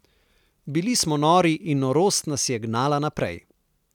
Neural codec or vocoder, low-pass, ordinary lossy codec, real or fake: none; 19.8 kHz; none; real